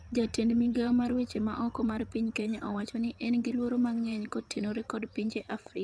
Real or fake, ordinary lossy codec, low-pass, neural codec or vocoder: fake; none; none; vocoder, 22.05 kHz, 80 mel bands, WaveNeXt